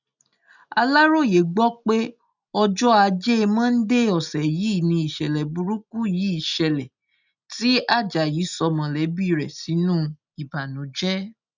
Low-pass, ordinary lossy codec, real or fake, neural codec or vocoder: 7.2 kHz; none; real; none